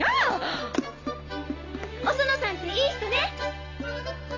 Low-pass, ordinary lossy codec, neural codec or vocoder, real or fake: 7.2 kHz; AAC, 32 kbps; none; real